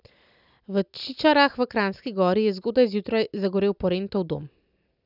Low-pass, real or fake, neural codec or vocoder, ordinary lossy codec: 5.4 kHz; real; none; none